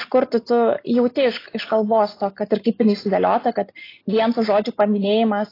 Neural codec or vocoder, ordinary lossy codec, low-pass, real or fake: none; AAC, 24 kbps; 5.4 kHz; real